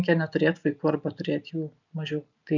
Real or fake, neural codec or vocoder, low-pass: real; none; 7.2 kHz